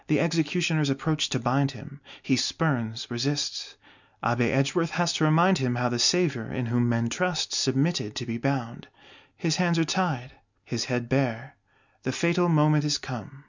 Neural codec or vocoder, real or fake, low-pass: none; real; 7.2 kHz